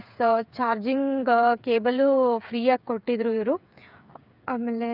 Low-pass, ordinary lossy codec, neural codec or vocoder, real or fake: 5.4 kHz; none; codec, 16 kHz, 8 kbps, FreqCodec, smaller model; fake